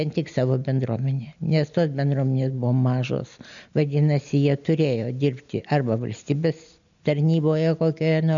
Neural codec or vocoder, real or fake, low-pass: none; real; 7.2 kHz